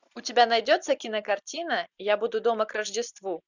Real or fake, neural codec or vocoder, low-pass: real; none; 7.2 kHz